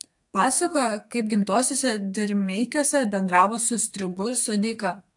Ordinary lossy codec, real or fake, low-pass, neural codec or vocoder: AAC, 64 kbps; fake; 10.8 kHz; codec, 32 kHz, 1.9 kbps, SNAC